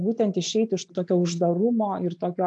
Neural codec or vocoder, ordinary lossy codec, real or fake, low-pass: none; AAC, 64 kbps; real; 9.9 kHz